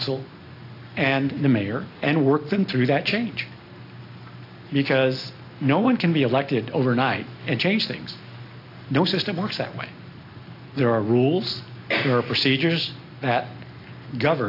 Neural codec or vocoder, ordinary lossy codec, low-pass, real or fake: none; AAC, 32 kbps; 5.4 kHz; real